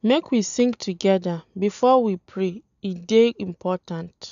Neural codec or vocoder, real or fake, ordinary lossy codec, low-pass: none; real; none; 7.2 kHz